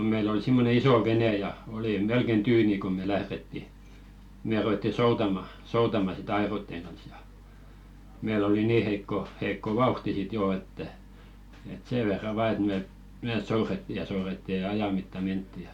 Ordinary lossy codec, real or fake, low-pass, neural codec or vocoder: MP3, 96 kbps; real; 19.8 kHz; none